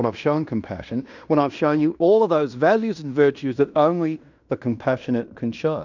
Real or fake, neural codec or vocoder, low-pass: fake; codec, 16 kHz in and 24 kHz out, 0.9 kbps, LongCat-Audio-Codec, fine tuned four codebook decoder; 7.2 kHz